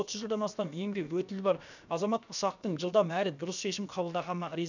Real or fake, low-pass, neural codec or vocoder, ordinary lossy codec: fake; 7.2 kHz; codec, 16 kHz, about 1 kbps, DyCAST, with the encoder's durations; none